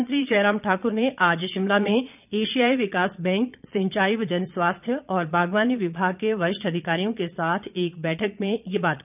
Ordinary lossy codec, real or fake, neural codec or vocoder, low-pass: none; fake; vocoder, 22.05 kHz, 80 mel bands, Vocos; 3.6 kHz